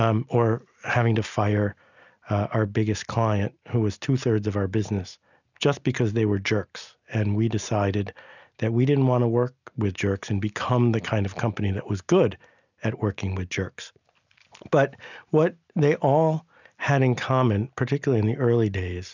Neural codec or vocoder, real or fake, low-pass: none; real; 7.2 kHz